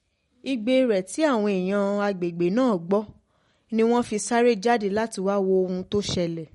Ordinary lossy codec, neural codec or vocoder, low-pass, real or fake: MP3, 48 kbps; none; 19.8 kHz; real